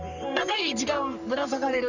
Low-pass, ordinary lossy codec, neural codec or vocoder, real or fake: 7.2 kHz; none; codec, 44.1 kHz, 3.4 kbps, Pupu-Codec; fake